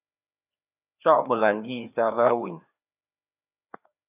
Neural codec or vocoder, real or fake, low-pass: codec, 16 kHz, 2 kbps, FreqCodec, larger model; fake; 3.6 kHz